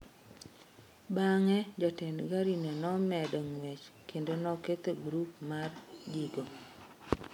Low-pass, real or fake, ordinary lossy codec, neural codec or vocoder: 19.8 kHz; real; MP3, 96 kbps; none